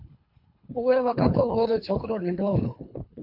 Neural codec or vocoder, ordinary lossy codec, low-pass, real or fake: codec, 24 kHz, 3 kbps, HILCodec; MP3, 48 kbps; 5.4 kHz; fake